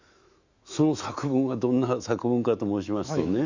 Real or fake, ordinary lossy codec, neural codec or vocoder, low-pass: fake; Opus, 64 kbps; autoencoder, 48 kHz, 128 numbers a frame, DAC-VAE, trained on Japanese speech; 7.2 kHz